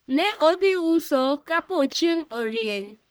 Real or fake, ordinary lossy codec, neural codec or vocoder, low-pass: fake; none; codec, 44.1 kHz, 1.7 kbps, Pupu-Codec; none